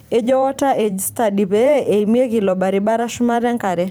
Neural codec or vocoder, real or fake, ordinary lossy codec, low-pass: vocoder, 44.1 kHz, 128 mel bands every 512 samples, BigVGAN v2; fake; none; none